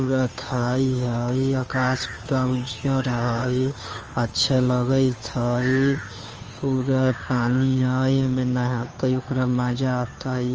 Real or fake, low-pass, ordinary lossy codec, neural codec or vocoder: fake; 7.2 kHz; Opus, 24 kbps; codec, 16 kHz, 2 kbps, FunCodec, trained on Chinese and English, 25 frames a second